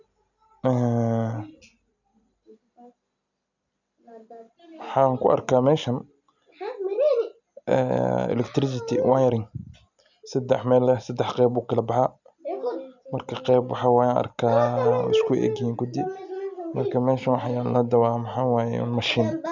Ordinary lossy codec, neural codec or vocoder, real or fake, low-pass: none; none; real; 7.2 kHz